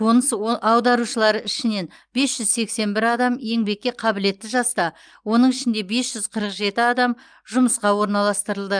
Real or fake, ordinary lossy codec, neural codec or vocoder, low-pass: real; Opus, 32 kbps; none; 9.9 kHz